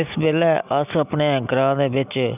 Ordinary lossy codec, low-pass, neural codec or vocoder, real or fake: none; 3.6 kHz; none; real